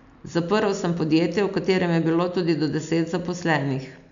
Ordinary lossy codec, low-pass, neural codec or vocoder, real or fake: MP3, 64 kbps; 7.2 kHz; none; real